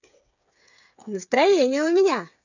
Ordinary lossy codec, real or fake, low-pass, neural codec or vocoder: none; fake; 7.2 kHz; codec, 16 kHz, 8 kbps, FreqCodec, smaller model